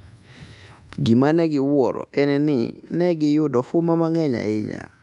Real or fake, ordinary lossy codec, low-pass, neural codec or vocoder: fake; none; 10.8 kHz; codec, 24 kHz, 1.2 kbps, DualCodec